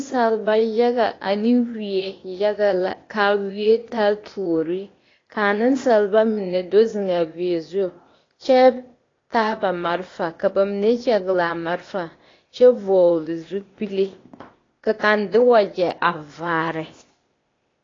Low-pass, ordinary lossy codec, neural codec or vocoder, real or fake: 7.2 kHz; AAC, 32 kbps; codec, 16 kHz, 0.8 kbps, ZipCodec; fake